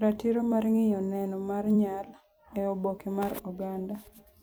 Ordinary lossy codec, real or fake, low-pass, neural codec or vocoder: none; fake; none; vocoder, 44.1 kHz, 128 mel bands every 256 samples, BigVGAN v2